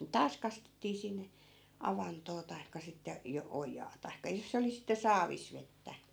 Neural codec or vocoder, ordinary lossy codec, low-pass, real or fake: none; none; none; real